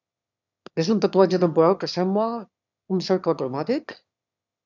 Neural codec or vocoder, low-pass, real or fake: autoencoder, 22.05 kHz, a latent of 192 numbers a frame, VITS, trained on one speaker; 7.2 kHz; fake